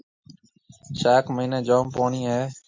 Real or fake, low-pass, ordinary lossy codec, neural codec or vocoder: real; 7.2 kHz; MP3, 48 kbps; none